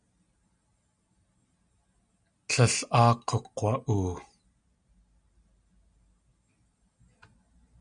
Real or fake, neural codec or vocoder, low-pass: real; none; 9.9 kHz